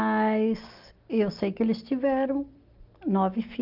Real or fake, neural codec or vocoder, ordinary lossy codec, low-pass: real; none; Opus, 24 kbps; 5.4 kHz